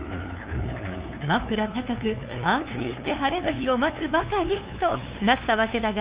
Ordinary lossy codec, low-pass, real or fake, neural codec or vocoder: Opus, 64 kbps; 3.6 kHz; fake; codec, 16 kHz, 2 kbps, FunCodec, trained on LibriTTS, 25 frames a second